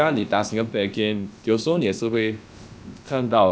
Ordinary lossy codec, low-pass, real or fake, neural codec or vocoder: none; none; fake; codec, 16 kHz, about 1 kbps, DyCAST, with the encoder's durations